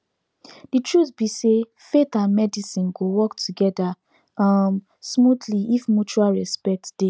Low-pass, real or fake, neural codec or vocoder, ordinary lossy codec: none; real; none; none